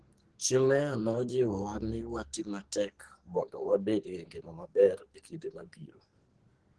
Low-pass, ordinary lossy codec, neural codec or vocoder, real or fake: 10.8 kHz; Opus, 16 kbps; codec, 44.1 kHz, 2.6 kbps, SNAC; fake